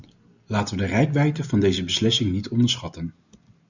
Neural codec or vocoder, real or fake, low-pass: none; real; 7.2 kHz